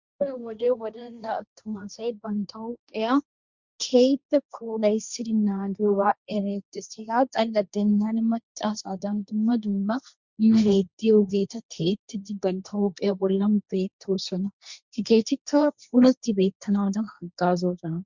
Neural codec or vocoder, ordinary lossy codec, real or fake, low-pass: codec, 16 kHz, 1.1 kbps, Voila-Tokenizer; Opus, 64 kbps; fake; 7.2 kHz